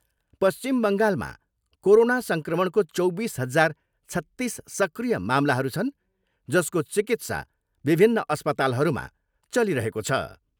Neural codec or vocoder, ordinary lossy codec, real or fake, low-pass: none; none; real; none